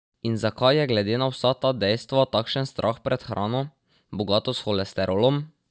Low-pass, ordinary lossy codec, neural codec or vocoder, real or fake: none; none; none; real